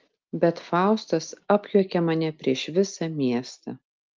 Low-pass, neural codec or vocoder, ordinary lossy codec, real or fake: 7.2 kHz; none; Opus, 24 kbps; real